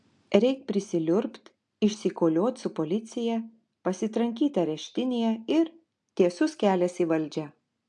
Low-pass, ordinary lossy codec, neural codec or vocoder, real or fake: 10.8 kHz; AAC, 64 kbps; none; real